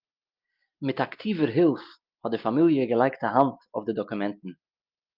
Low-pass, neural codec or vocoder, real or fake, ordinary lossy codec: 5.4 kHz; none; real; Opus, 24 kbps